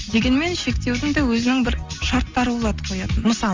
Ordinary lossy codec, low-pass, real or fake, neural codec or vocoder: Opus, 32 kbps; 7.2 kHz; real; none